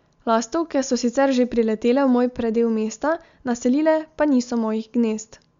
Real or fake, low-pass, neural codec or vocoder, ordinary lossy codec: real; 7.2 kHz; none; Opus, 64 kbps